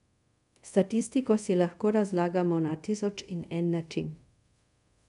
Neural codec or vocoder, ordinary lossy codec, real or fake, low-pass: codec, 24 kHz, 0.5 kbps, DualCodec; none; fake; 10.8 kHz